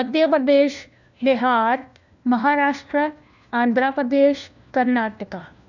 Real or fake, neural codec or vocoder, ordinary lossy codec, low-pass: fake; codec, 16 kHz, 1 kbps, FunCodec, trained on LibriTTS, 50 frames a second; none; 7.2 kHz